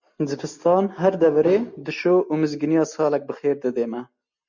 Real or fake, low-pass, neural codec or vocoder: real; 7.2 kHz; none